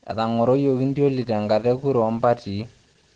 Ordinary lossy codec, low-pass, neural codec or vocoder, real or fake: Opus, 16 kbps; 9.9 kHz; none; real